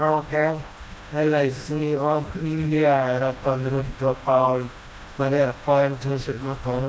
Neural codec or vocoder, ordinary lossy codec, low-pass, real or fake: codec, 16 kHz, 1 kbps, FreqCodec, smaller model; none; none; fake